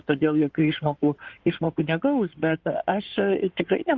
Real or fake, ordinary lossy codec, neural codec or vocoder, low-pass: fake; Opus, 24 kbps; codec, 16 kHz in and 24 kHz out, 2.2 kbps, FireRedTTS-2 codec; 7.2 kHz